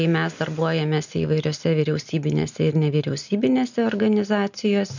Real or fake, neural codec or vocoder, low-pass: real; none; 7.2 kHz